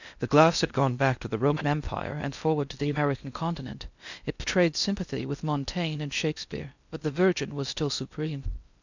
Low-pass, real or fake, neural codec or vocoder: 7.2 kHz; fake; codec, 16 kHz in and 24 kHz out, 0.6 kbps, FocalCodec, streaming, 4096 codes